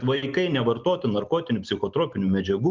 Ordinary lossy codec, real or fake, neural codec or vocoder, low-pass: Opus, 32 kbps; real; none; 7.2 kHz